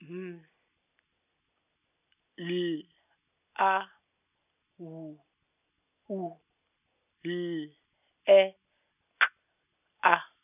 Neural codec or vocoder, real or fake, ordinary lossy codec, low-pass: none; real; none; 3.6 kHz